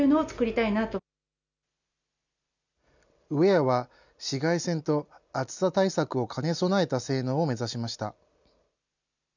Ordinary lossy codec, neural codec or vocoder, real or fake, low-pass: none; none; real; 7.2 kHz